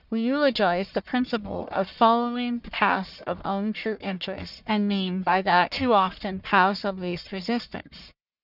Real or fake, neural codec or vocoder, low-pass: fake; codec, 44.1 kHz, 1.7 kbps, Pupu-Codec; 5.4 kHz